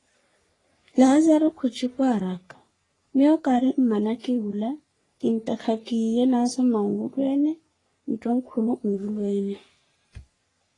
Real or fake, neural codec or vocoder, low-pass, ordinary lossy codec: fake; codec, 44.1 kHz, 3.4 kbps, Pupu-Codec; 10.8 kHz; AAC, 32 kbps